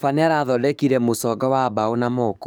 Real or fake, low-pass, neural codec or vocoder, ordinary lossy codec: fake; none; codec, 44.1 kHz, 7.8 kbps, DAC; none